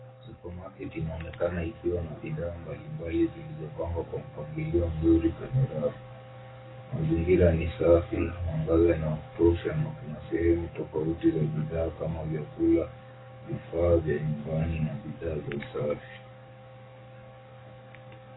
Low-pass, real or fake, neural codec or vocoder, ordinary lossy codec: 7.2 kHz; fake; codec, 44.1 kHz, 7.8 kbps, DAC; AAC, 16 kbps